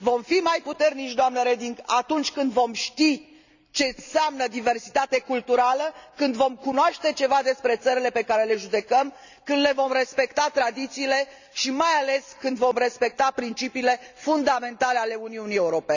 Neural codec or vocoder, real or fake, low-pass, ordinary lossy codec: none; real; 7.2 kHz; none